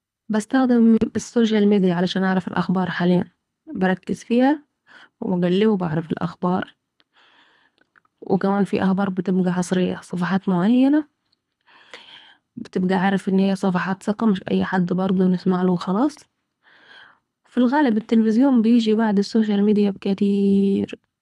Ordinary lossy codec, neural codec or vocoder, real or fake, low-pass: none; codec, 24 kHz, 3 kbps, HILCodec; fake; none